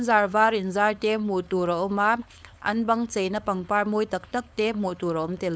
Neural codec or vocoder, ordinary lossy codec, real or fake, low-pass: codec, 16 kHz, 4.8 kbps, FACodec; none; fake; none